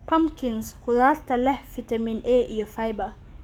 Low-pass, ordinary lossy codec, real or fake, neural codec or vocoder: 19.8 kHz; none; fake; codec, 44.1 kHz, 7.8 kbps, DAC